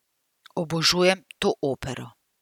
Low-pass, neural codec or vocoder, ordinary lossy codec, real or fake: 19.8 kHz; none; none; real